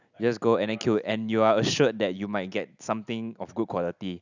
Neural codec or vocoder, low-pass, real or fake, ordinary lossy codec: none; 7.2 kHz; real; none